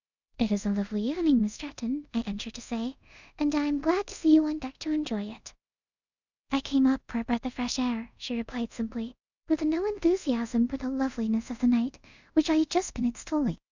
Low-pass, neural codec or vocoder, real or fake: 7.2 kHz; codec, 24 kHz, 0.5 kbps, DualCodec; fake